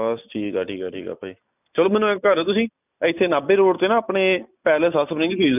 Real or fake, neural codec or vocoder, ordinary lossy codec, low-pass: real; none; none; 3.6 kHz